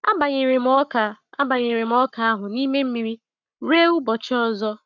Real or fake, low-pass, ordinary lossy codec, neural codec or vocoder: fake; 7.2 kHz; none; codec, 44.1 kHz, 7.8 kbps, Pupu-Codec